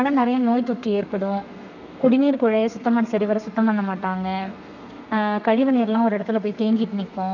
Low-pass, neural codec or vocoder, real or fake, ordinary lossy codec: 7.2 kHz; codec, 32 kHz, 1.9 kbps, SNAC; fake; none